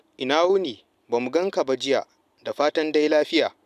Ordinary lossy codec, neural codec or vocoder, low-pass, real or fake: none; none; 14.4 kHz; real